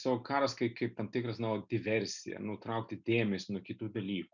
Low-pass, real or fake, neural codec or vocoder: 7.2 kHz; real; none